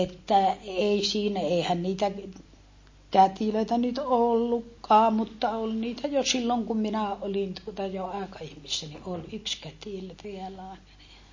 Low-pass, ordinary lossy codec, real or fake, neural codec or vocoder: 7.2 kHz; MP3, 32 kbps; real; none